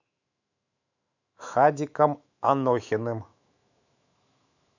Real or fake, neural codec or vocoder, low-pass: fake; autoencoder, 48 kHz, 128 numbers a frame, DAC-VAE, trained on Japanese speech; 7.2 kHz